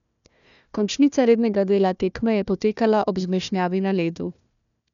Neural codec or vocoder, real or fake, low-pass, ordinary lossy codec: codec, 16 kHz, 1 kbps, FunCodec, trained on Chinese and English, 50 frames a second; fake; 7.2 kHz; none